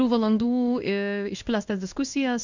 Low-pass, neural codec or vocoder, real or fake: 7.2 kHz; codec, 16 kHz in and 24 kHz out, 1 kbps, XY-Tokenizer; fake